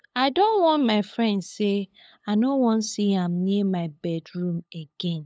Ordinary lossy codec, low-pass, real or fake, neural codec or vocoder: none; none; fake; codec, 16 kHz, 8 kbps, FunCodec, trained on LibriTTS, 25 frames a second